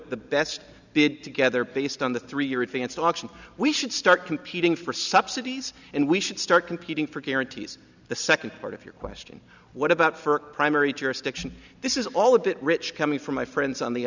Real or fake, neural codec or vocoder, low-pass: real; none; 7.2 kHz